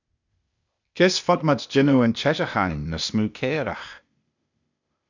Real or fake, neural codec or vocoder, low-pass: fake; codec, 16 kHz, 0.8 kbps, ZipCodec; 7.2 kHz